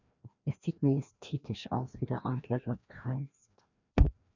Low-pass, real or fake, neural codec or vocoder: 7.2 kHz; fake; codec, 16 kHz, 1 kbps, FreqCodec, larger model